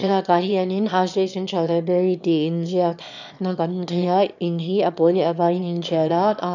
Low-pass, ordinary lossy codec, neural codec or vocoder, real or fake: 7.2 kHz; none; autoencoder, 22.05 kHz, a latent of 192 numbers a frame, VITS, trained on one speaker; fake